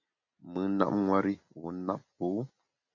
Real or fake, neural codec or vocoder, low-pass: real; none; 7.2 kHz